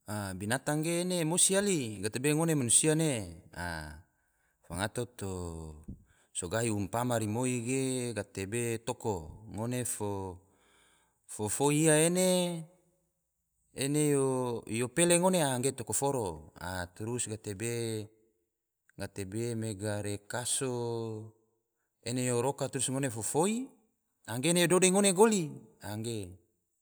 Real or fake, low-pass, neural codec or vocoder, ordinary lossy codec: real; none; none; none